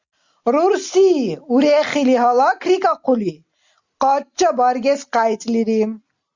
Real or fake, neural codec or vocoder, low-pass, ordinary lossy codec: real; none; 7.2 kHz; Opus, 64 kbps